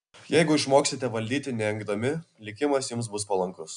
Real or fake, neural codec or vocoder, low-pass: real; none; 9.9 kHz